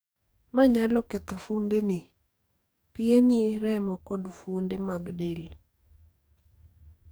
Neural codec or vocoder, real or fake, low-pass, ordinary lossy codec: codec, 44.1 kHz, 2.6 kbps, DAC; fake; none; none